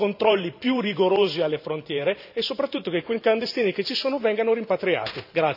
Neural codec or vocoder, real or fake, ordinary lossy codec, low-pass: vocoder, 44.1 kHz, 128 mel bands every 512 samples, BigVGAN v2; fake; none; 5.4 kHz